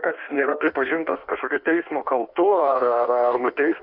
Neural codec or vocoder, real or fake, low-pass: codec, 16 kHz in and 24 kHz out, 1.1 kbps, FireRedTTS-2 codec; fake; 5.4 kHz